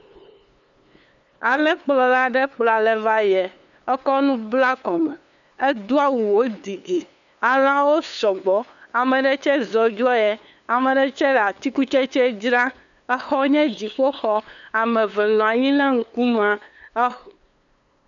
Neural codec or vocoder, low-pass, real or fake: codec, 16 kHz, 2 kbps, FunCodec, trained on LibriTTS, 25 frames a second; 7.2 kHz; fake